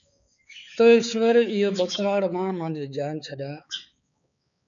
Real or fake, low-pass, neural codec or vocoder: fake; 7.2 kHz; codec, 16 kHz, 4 kbps, X-Codec, HuBERT features, trained on balanced general audio